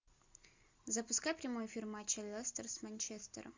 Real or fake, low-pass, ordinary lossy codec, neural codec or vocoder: real; 7.2 kHz; MP3, 64 kbps; none